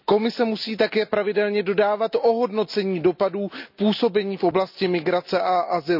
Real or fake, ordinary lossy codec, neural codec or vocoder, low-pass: real; none; none; 5.4 kHz